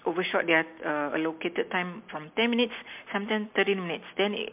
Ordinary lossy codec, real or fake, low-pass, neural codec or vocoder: MP3, 32 kbps; real; 3.6 kHz; none